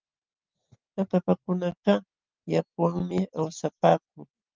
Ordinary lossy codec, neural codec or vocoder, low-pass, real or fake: Opus, 32 kbps; none; 7.2 kHz; real